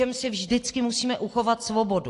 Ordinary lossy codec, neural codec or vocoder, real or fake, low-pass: AAC, 48 kbps; none; real; 10.8 kHz